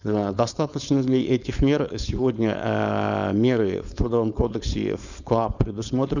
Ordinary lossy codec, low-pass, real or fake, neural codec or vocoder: none; 7.2 kHz; fake; codec, 16 kHz, 4.8 kbps, FACodec